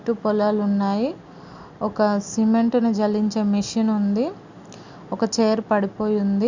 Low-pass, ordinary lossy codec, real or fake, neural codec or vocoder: 7.2 kHz; Opus, 64 kbps; real; none